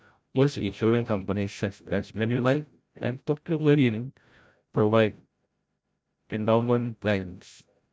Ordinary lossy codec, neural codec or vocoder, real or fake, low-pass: none; codec, 16 kHz, 0.5 kbps, FreqCodec, larger model; fake; none